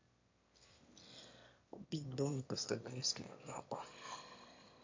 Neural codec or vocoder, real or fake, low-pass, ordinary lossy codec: autoencoder, 22.05 kHz, a latent of 192 numbers a frame, VITS, trained on one speaker; fake; 7.2 kHz; MP3, 48 kbps